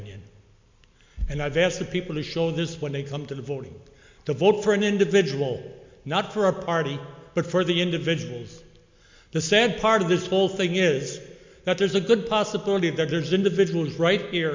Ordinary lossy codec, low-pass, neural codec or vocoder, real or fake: MP3, 64 kbps; 7.2 kHz; none; real